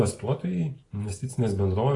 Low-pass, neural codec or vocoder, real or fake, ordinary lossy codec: 10.8 kHz; none; real; AAC, 32 kbps